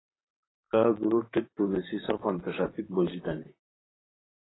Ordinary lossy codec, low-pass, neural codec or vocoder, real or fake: AAC, 16 kbps; 7.2 kHz; vocoder, 44.1 kHz, 128 mel bands, Pupu-Vocoder; fake